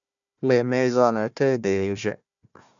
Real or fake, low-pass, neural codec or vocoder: fake; 7.2 kHz; codec, 16 kHz, 1 kbps, FunCodec, trained on Chinese and English, 50 frames a second